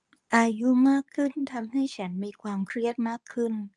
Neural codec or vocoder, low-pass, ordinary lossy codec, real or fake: codec, 24 kHz, 0.9 kbps, WavTokenizer, medium speech release version 2; 10.8 kHz; none; fake